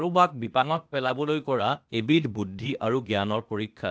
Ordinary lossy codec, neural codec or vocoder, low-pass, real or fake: none; codec, 16 kHz, 0.8 kbps, ZipCodec; none; fake